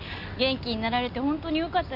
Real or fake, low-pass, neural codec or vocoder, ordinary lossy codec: real; 5.4 kHz; none; none